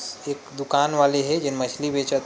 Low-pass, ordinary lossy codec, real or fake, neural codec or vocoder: none; none; real; none